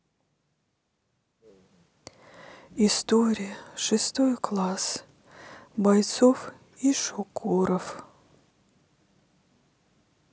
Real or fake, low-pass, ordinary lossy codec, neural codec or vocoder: real; none; none; none